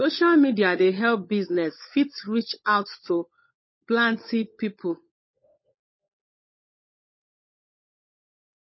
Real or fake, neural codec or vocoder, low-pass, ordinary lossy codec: fake; codec, 16 kHz, 16 kbps, FunCodec, trained on LibriTTS, 50 frames a second; 7.2 kHz; MP3, 24 kbps